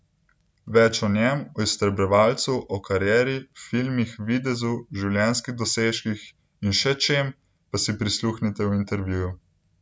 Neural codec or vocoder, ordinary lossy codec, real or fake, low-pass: none; none; real; none